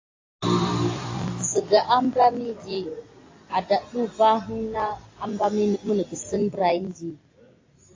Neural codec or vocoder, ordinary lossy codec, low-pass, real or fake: none; AAC, 32 kbps; 7.2 kHz; real